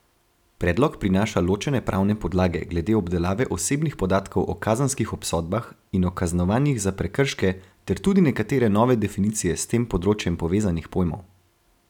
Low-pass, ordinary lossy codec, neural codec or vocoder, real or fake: 19.8 kHz; none; none; real